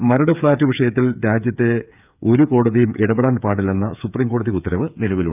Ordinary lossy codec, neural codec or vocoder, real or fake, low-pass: none; codec, 16 kHz, 8 kbps, FreqCodec, smaller model; fake; 3.6 kHz